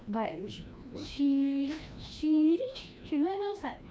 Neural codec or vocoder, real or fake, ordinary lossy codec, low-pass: codec, 16 kHz, 1 kbps, FreqCodec, larger model; fake; none; none